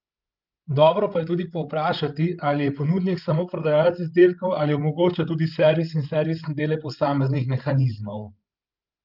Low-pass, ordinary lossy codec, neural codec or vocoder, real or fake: 5.4 kHz; Opus, 32 kbps; codec, 16 kHz, 16 kbps, FreqCodec, larger model; fake